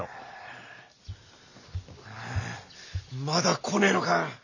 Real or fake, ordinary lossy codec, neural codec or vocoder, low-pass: real; none; none; 7.2 kHz